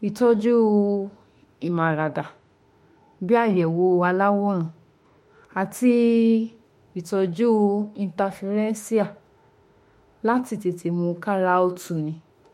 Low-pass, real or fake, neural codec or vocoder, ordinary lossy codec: 19.8 kHz; fake; autoencoder, 48 kHz, 32 numbers a frame, DAC-VAE, trained on Japanese speech; MP3, 64 kbps